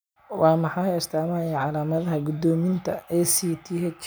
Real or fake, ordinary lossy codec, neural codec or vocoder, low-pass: real; none; none; none